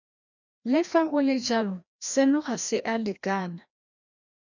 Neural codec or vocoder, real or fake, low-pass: codec, 16 kHz, 1 kbps, FreqCodec, larger model; fake; 7.2 kHz